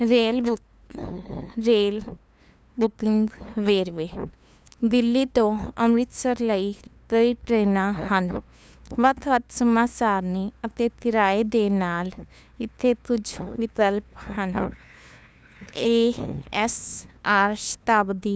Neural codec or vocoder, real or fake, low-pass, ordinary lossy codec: codec, 16 kHz, 2 kbps, FunCodec, trained on LibriTTS, 25 frames a second; fake; none; none